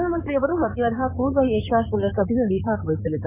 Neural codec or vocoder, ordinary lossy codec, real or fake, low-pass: codec, 16 kHz in and 24 kHz out, 2.2 kbps, FireRedTTS-2 codec; none; fake; 3.6 kHz